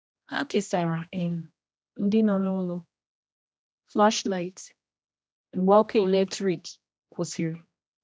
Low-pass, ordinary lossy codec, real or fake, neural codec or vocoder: none; none; fake; codec, 16 kHz, 1 kbps, X-Codec, HuBERT features, trained on general audio